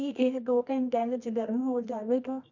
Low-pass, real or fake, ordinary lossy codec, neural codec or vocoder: 7.2 kHz; fake; none; codec, 24 kHz, 0.9 kbps, WavTokenizer, medium music audio release